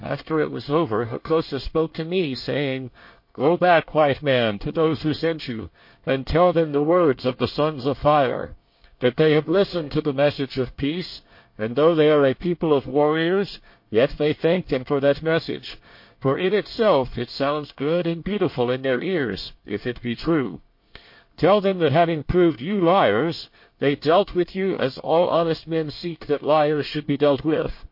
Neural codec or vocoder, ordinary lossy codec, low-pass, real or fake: codec, 24 kHz, 1 kbps, SNAC; MP3, 32 kbps; 5.4 kHz; fake